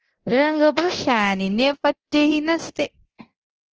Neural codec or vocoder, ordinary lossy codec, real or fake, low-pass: codec, 24 kHz, 0.9 kbps, DualCodec; Opus, 16 kbps; fake; 7.2 kHz